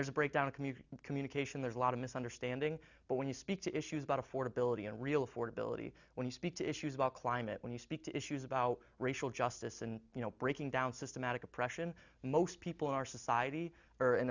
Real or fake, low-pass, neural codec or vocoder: real; 7.2 kHz; none